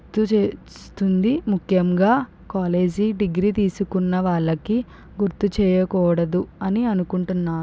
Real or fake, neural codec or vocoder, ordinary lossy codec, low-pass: real; none; none; none